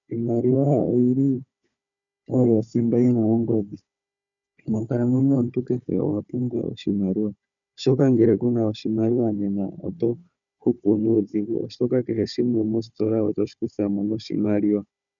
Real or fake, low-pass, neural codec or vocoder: fake; 7.2 kHz; codec, 16 kHz, 4 kbps, FunCodec, trained on Chinese and English, 50 frames a second